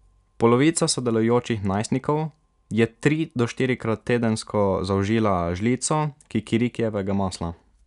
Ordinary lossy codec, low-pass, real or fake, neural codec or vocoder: none; 10.8 kHz; real; none